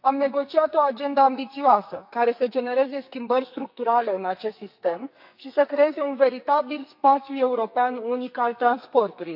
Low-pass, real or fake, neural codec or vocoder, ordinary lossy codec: 5.4 kHz; fake; codec, 44.1 kHz, 2.6 kbps, SNAC; none